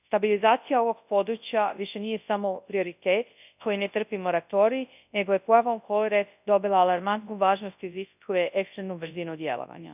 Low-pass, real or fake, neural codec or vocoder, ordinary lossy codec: 3.6 kHz; fake; codec, 24 kHz, 0.9 kbps, WavTokenizer, large speech release; none